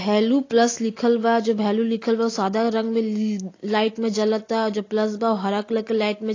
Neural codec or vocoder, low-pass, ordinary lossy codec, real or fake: none; 7.2 kHz; AAC, 32 kbps; real